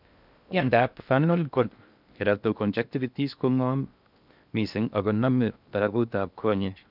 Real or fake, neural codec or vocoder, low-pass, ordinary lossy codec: fake; codec, 16 kHz in and 24 kHz out, 0.6 kbps, FocalCodec, streaming, 2048 codes; 5.4 kHz; none